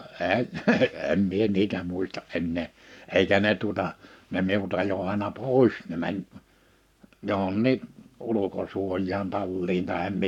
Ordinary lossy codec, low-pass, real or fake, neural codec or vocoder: none; 19.8 kHz; fake; codec, 44.1 kHz, 7.8 kbps, Pupu-Codec